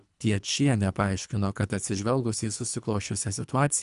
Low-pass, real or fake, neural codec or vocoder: 10.8 kHz; fake; codec, 24 kHz, 3 kbps, HILCodec